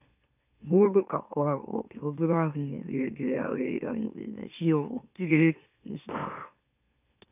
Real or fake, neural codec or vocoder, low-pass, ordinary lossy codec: fake; autoencoder, 44.1 kHz, a latent of 192 numbers a frame, MeloTTS; 3.6 kHz; none